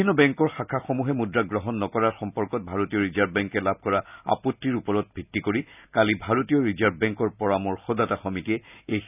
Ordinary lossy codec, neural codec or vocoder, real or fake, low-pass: none; none; real; 3.6 kHz